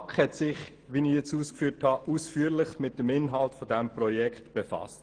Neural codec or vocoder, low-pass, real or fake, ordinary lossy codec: autoencoder, 48 kHz, 128 numbers a frame, DAC-VAE, trained on Japanese speech; 9.9 kHz; fake; Opus, 16 kbps